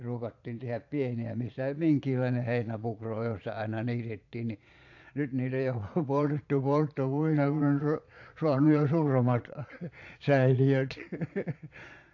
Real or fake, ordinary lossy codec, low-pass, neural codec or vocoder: fake; AAC, 48 kbps; 7.2 kHz; vocoder, 22.05 kHz, 80 mel bands, Vocos